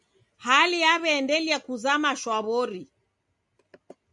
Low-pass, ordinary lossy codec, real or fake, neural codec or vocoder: 10.8 kHz; MP3, 96 kbps; real; none